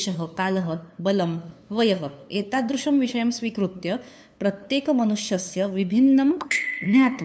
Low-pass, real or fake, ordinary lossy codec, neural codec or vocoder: none; fake; none; codec, 16 kHz, 2 kbps, FunCodec, trained on LibriTTS, 25 frames a second